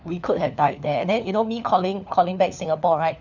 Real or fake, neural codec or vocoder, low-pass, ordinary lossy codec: fake; codec, 16 kHz, 4 kbps, FunCodec, trained on LibriTTS, 50 frames a second; 7.2 kHz; none